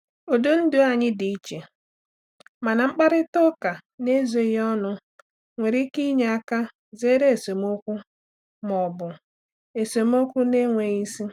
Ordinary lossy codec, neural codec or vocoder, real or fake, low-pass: none; none; real; 19.8 kHz